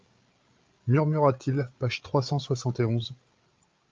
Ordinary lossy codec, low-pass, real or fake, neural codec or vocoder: Opus, 24 kbps; 7.2 kHz; fake; codec, 16 kHz, 8 kbps, FreqCodec, larger model